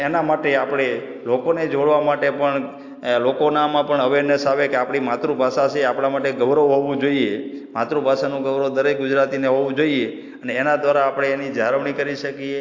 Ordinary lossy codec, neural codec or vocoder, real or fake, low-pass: AAC, 48 kbps; none; real; 7.2 kHz